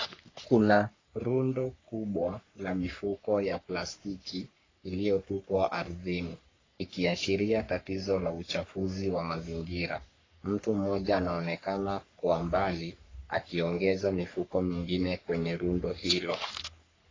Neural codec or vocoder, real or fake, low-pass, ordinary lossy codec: codec, 44.1 kHz, 3.4 kbps, Pupu-Codec; fake; 7.2 kHz; AAC, 32 kbps